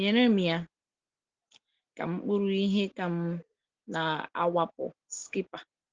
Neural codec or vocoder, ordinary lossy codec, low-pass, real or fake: none; Opus, 16 kbps; 7.2 kHz; real